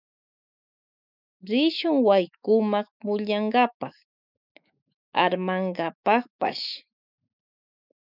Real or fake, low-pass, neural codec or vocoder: fake; 5.4 kHz; autoencoder, 48 kHz, 128 numbers a frame, DAC-VAE, trained on Japanese speech